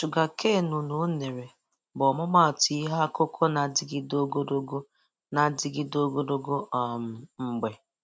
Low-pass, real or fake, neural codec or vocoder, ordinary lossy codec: none; real; none; none